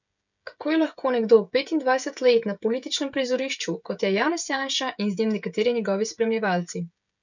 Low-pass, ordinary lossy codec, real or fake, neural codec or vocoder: 7.2 kHz; none; fake; codec, 16 kHz, 16 kbps, FreqCodec, smaller model